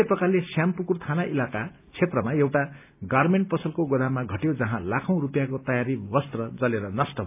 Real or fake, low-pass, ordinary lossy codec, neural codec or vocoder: real; 3.6 kHz; AAC, 32 kbps; none